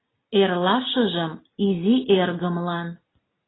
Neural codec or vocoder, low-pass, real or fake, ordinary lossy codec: none; 7.2 kHz; real; AAC, 16 kbps